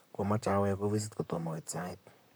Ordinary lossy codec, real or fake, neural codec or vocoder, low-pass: none; fake; vocoder, 44.1 kHz, 128 mel bands, Pupu-Vocoder; none